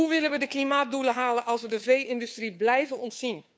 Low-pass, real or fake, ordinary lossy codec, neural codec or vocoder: none; fake; none; codec, 16 kHz, 2 kbps, FunCodec, trained on Chinese and English, 25 frames a second